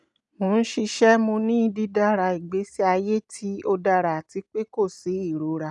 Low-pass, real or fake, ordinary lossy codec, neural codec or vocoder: 10.8 kHz; real; none; none